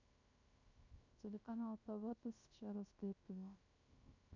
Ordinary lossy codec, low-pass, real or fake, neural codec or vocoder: none; 7.2 kHz; fake; codec, 16 kHz, 0.3 kbps, FocalCodec